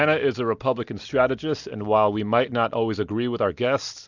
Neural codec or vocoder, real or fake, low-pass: none; real; 7.2 kHz